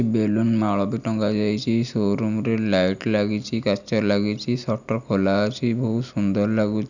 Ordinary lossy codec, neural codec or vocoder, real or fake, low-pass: none; none; real; 7.2 kHz